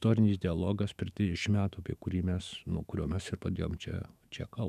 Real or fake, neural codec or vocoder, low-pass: fake; autoencoder, 48 kHz, 128 numbers a frame, DAC-VAE, trained on Japanese speech; 14.4 kHz